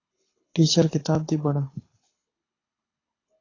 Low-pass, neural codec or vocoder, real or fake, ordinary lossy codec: 7.2 kHz; codec, 24 kHz, 6 kbps, HILCodec; fake; AAC, 32 kbps